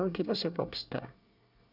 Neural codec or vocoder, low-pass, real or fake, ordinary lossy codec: codec, 24 kHz, 1 kbps, SNAC; 5.4 kHz; fake; none